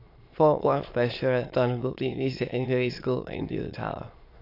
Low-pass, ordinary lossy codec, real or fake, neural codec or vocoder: 5.4 kHz; AAC, 32 kbps; fake; autoencoder, 22.05 kHz, a latent of 192 numbers a frame, VITS, trained on many speakers